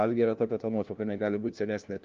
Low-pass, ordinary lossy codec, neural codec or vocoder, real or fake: 7.2 kHz; Opus, 32 kbps; codec, 16 kHz, 1 kbps, FunCodec, trained on LibriTTS, 50 frames a second; fake